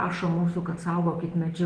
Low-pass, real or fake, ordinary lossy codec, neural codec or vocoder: 9.9 kHz; real; Opus, 16 kbps; none